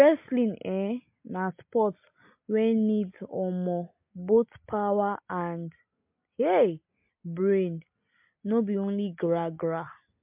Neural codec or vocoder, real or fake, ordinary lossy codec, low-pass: none; real; MP3, 32 kbps; 3.6 kHz